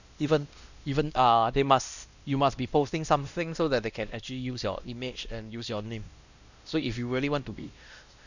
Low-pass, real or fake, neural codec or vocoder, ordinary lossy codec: 7.2 kHz; fake; codec, 16 kHz, 1 kbps, X-Codec, WavLM features, trained on Multilingual LibriSpeech; none